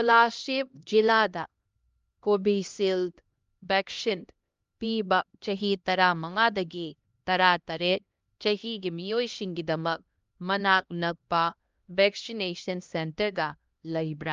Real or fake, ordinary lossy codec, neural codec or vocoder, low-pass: fake; Opus, 32 kbps; codec, 16 kHz, 1 kbps, X-Codec, HuBERT features, trained on LibriSpeech; 7.2 kHz